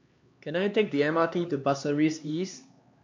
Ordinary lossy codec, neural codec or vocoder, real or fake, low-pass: MP3, 48 kbps; codec, 16 kHz, 2 kbps, X-Codec, HuBERT features, trained on LibriSpeech; fake; 7.2 kHz